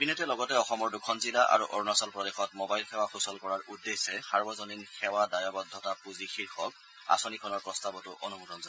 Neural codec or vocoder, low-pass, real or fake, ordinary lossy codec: none; none; real; none